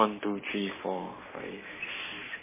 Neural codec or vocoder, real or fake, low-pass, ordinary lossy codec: codec, 44.1 kHz, 7.8 kbps, Pupu-Codec; fake; 3.6 kHz; MP3, 16 kbps